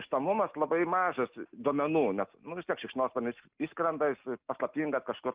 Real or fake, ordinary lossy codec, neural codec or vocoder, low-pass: real; Opus, 24 kbps; none; 3.6 kHz